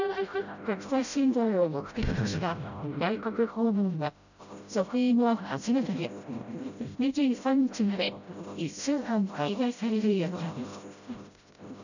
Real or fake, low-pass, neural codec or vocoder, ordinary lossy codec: fake; 7.2 kHz; codec, 16 kHz, 0.5 kbps, FreqCodec, smaller model; none